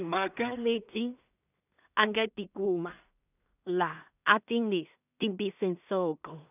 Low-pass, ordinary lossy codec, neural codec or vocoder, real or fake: 3.6 kHz; none; codec, 16 kHz in and 24 kHz out, 0.4 kbps, LongCat-Audio-Codec, two codebook decoder; fake